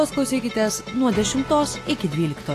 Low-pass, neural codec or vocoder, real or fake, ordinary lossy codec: 14.4 kHz; none; real; AAC, 48 kbps